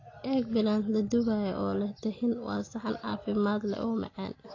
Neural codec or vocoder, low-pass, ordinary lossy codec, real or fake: none; 7.2 kHz; AAC, 32 kbps; real